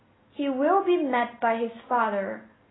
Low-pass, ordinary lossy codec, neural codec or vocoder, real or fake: 7.2 kHz; AAC, 16 kbps; none; real